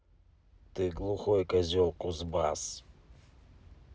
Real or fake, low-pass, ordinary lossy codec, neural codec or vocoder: real; none; none; none